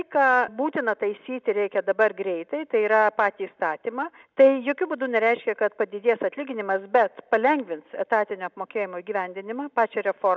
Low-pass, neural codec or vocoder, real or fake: 7.2 kHz; none; real